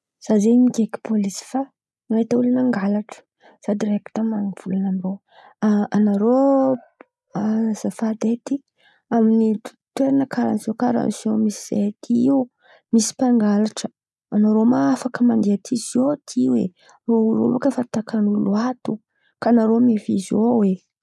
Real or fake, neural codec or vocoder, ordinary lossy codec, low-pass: real; none; none; none